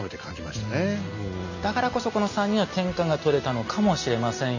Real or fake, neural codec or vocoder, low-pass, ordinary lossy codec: real; none; 7.2 kHz; none